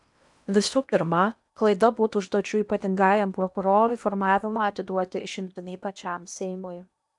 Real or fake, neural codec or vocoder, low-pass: fake; codec, 16 kHz in and 24 kHz out, 0.8 kbps, FocalCodec, streaming, 65536 codes; 10.8 kHz